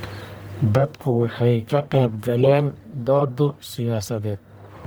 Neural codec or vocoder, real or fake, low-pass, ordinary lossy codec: codec, 44.1 kHz, 1.7 kbps, Pupu-Codec; fake; none; none